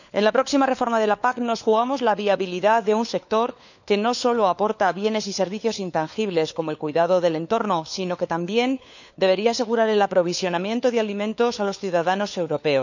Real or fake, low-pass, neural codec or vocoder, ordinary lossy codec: fake; 7.2 kHz; codec, 16 kHz, 4 kbps, FunCodec, trained on LibriTTS, 50 frames a second; none